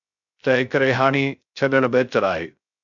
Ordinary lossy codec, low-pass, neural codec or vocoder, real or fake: MP3, 64 kbps; 7.2 kHz; codec, 16 kHz, 0.3 kbps, FocalCodec; fake